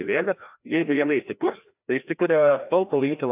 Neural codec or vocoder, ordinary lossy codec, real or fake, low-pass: codec, 16 kHz, 1 kbps, FreqCodec, larger model; AAC, 32 kbps; fake; 3.6 kHz